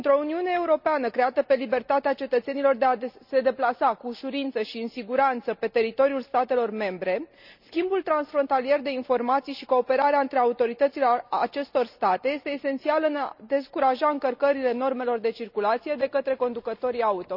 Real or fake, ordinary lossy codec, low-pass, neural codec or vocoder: real; none; 5.4 kHz; none